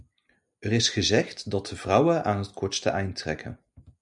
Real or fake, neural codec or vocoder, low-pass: real; none; 10.8 kHz